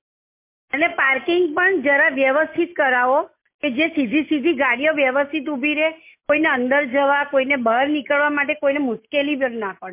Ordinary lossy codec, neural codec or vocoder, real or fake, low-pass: MP3, 24 kbps; none; real; 3.6 kHz